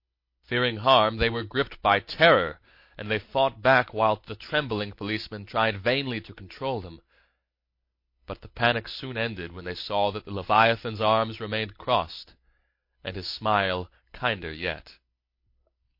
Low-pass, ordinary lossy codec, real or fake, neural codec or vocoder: 5.4 kHz; MP3, 32 kbps; real; none